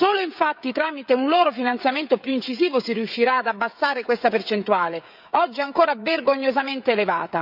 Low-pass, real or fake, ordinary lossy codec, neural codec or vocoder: 5.4 kHz; fake; none; codec, 16 kHz, 16 kbps, FreqCodec, smaller model